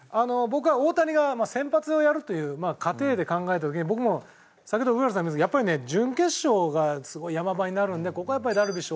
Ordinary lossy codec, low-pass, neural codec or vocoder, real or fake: none; none; none; real